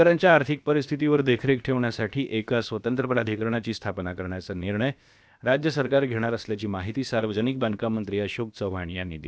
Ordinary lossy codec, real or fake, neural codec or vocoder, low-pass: none; fake; codec, 16 kHz, about 1 kbps, DyCAST, with the encoder's durations; none